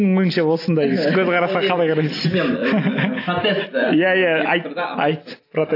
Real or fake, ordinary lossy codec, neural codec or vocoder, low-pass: real; AAC, 32 kbps; none; 5.4 kHz